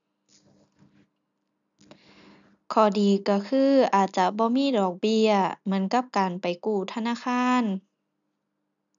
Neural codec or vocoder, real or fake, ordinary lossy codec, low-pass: none; real; none; 7.2 kHz